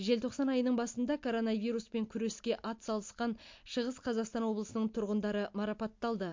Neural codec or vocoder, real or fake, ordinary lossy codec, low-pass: none; real; MP3, 48 kbps; 7.2 kHz